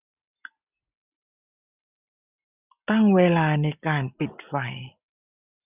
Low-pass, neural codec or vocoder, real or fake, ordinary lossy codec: 3.6 kHz; none; real; none